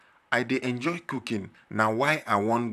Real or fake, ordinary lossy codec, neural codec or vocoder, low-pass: fake; none; vocoder, 44.1 kHz, 128 mel bands, Pupu-Vocoder; 14.4 kHz